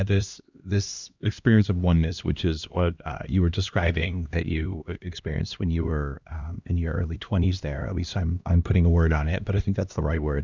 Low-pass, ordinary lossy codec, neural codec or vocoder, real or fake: 7.2 kHz; Opus, 64 kbps; codec, 16 kHz, 1 kbps, X-Codec, HuBERT features, trained on LibriSpeech; fake